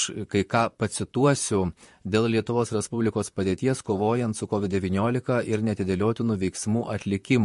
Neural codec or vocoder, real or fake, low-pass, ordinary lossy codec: vocoder, 44.1 kHz, 128 mel bands, Pupu-Vocoder; fake; 14.4 kHz; MP3, 48 kbps